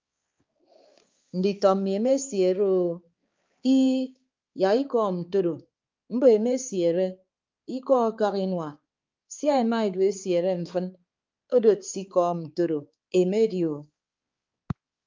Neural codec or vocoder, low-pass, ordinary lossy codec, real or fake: codec, 16 kHz, 4 kbps, X-Codec, HuBERT features, trained on balanced general audio; 7.2 kHz; Opus, 24 kbps; fake